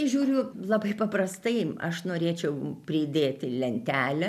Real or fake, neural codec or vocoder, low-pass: real; none; 14.4 kHz